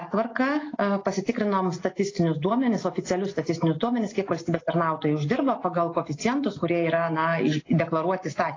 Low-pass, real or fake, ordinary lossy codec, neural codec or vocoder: 7.2 kHz; real; AAC, 32 kbps; none